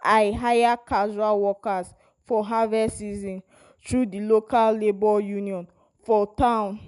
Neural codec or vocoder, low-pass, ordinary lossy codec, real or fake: none; 10.8 kHz; none; real